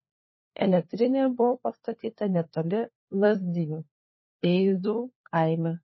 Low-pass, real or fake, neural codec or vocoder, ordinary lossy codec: 7.2 kHz; fake; codec, 16 kHz, 4 kbps, FunCodec, trained on LibriTTS, 50 frames a second; MP3, 24 kbps